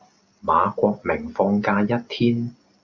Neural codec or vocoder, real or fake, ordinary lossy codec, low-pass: none; real; Opus, 64 kbps; 7.2 kHz